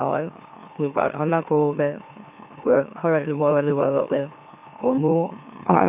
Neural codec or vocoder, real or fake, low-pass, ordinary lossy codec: autoencoder, 44.1 kHz, a latent of 192 numbers a frame, MeloTTS; fake; 3.6 kHz; AAC, 32 kbps